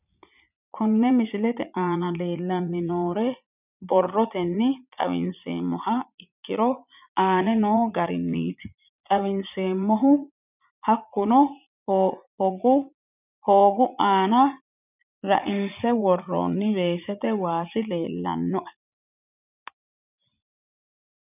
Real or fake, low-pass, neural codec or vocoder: fake; 3.6 kHz; vocoder, 44.1 kHz, 80 mel bands, Vocos